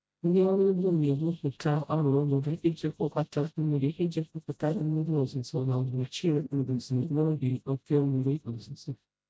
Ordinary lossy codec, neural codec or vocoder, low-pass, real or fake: none; codec, 16 kHz, 0.5 kbps, FreqCodec, smaller model; none; fake